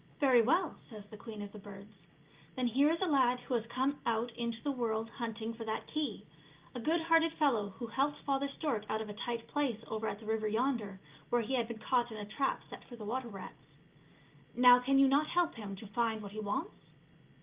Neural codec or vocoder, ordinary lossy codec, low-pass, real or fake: none; Opus, 24 kbps; 3.6 kHz; real